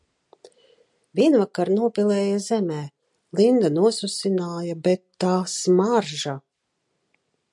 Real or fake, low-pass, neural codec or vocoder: real; 10.8 kHz; none